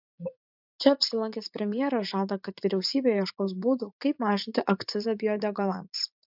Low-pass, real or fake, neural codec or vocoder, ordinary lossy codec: 5.4 kHz; fake; vocoder, 44.1 kHz, 80 mel bands, Vocos; MP3, 48 kbps